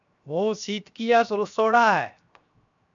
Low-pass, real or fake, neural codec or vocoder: 7.2 kHz; fake; codec, 16 kHz, 0.7 kbps, FocalCodec